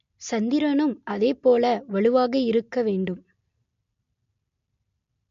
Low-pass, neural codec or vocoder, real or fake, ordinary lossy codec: 7.2 kHz; none; real; MP3, 48 kbps